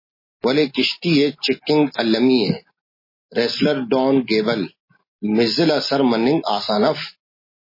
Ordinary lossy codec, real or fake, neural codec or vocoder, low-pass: MP3, 24 kbps; real; none; 5.4 kHz